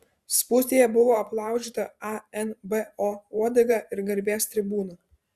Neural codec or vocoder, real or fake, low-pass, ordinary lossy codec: vocoder, 44.1 kHz, 128 mel bands every 512 samples, BigVGAN v2; fake; 14.4 kHz; Opus, 64 kbps